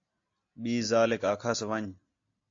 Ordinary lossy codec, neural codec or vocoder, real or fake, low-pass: AAC, 48 kbps; none; real; 7.2 kHz